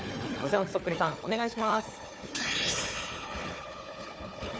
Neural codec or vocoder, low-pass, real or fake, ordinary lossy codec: codec, 16 kHz, 4 kbps, FunCodec, trained on Chinese and English, 50 frames a second; none; fake; none